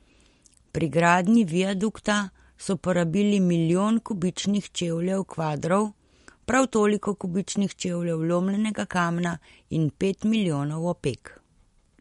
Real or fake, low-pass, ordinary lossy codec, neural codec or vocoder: real; 19.8 kHz; MP3, 48 kbps; none